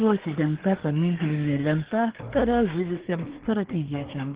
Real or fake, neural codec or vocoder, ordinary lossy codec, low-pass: fake; codec, 24 kHz, 1 kbps, SNAC; Opus, 16 kbps; 3.6 kHz